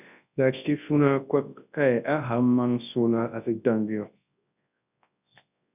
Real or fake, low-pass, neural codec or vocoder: fake; 3.6 kHz; codec, 24 kHz, 0.9 kbps, WavTokenizer, large speech release